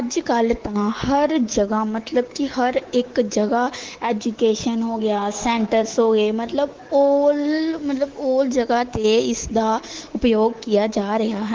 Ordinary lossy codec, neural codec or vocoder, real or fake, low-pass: Opus, 16 kbps; codec, 24 kHz, 3.1 kbps, DualCodec; fake; 7.2 kHz